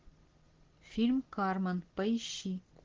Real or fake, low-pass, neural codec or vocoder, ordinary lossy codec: real; 7.2 kHz; none; Opus, 16 kbps